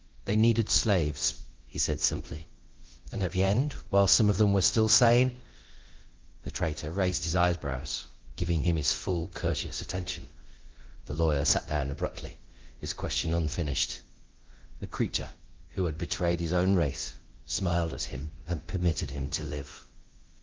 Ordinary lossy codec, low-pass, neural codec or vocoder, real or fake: Opus, 16 kbps; 7.2 kHz; codec, 24 kHz, 0.9 kbps, DualCodec; fake